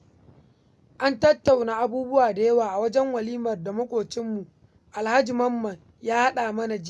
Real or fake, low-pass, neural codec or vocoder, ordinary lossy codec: real; none; none; none